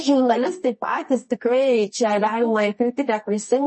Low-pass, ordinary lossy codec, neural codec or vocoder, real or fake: 10.8 kHz; MP3, 32 kbps; codec, 24 kHz, 0.9 kbps, WavTokenizer, medium music audio release; fake